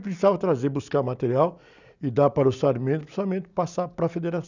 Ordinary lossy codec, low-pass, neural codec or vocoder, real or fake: none; 7.2 kHz; none; real